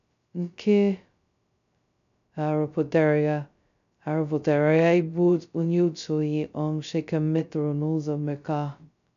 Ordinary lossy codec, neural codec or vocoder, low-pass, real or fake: none; codec, 16 kHz, 0.2 kbps, FocalCodec; 7.2 kHz; fake